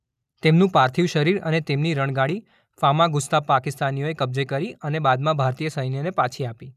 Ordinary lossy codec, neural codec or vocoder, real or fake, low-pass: none; none; real; 14.4 kHz